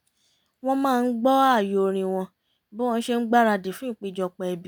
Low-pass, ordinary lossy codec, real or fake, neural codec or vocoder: none; none; real; none